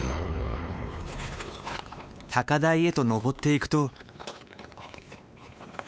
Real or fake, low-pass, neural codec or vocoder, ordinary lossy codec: fake; none; codec, 16 kHz, 2 kbps, X-Codec, WavLM features, trained on Multilingual LibriSpeech; none